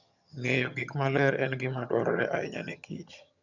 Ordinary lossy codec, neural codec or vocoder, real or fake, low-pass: none; vocoder, 22.05 kHz, 80 mel bands, HiFi-GAN; fake; 7.2 kHz